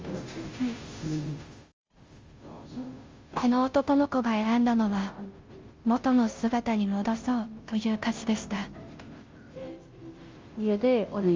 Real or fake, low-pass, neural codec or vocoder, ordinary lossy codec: fake; 7.2 kHz; codec, 16 kHz, 0.5 kbps, FunCodec, trained on Chinese and English, 25 frames a second; Opus, 32 kbps